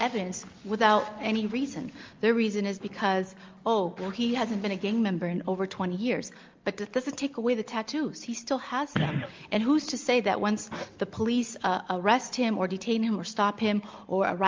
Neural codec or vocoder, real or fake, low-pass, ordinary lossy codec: none; real; 7.2 kHz; Opus, 32 kbps